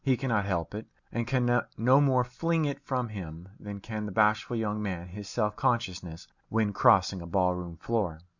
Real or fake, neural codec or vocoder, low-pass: real; none; 7.2 kHz